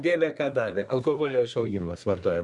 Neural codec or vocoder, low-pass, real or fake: codec, 24 kHz, 1 kbps, SNAC; 10.8 kHz; fake